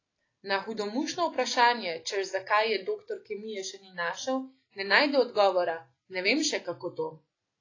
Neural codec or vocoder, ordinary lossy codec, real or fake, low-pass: none; AAC, 32 kbps; real; 7.2 kHz